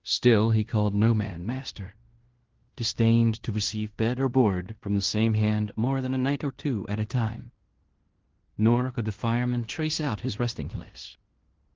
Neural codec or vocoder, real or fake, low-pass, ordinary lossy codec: codec, 16 kHz in and 24 kHz out, 0.9 kbps, LongCat-Audio-Codec, fine tuned four codebook decoder; fake; 7.2 kHz; Opus, 16 kbps